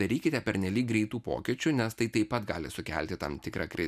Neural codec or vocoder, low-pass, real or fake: none; 14.4 kHz; real